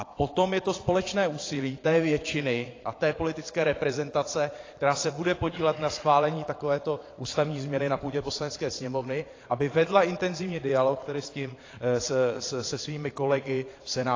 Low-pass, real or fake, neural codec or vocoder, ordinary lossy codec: 7.2 kHz; fake; vocoder, 22.05 kHz, 80 mel bands, Vocos; AAC, 32 kbps